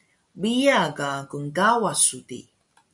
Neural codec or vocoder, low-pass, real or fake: none; 10.8 kHz; real